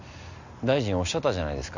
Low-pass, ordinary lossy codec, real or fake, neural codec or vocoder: 7.2 kHz; none; real; none